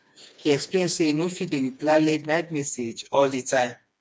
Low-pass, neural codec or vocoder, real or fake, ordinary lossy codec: none; codec, 16 kHz, 2 kbps, FreqCodec, smaller model; fake; none